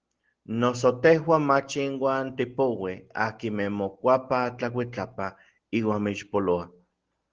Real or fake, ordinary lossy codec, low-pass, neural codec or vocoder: real; Opus, 16 kbps; 7.2 kHz; none